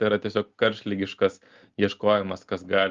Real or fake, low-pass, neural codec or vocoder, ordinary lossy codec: real; 7.2 kHz; none; Opus, 32 kbps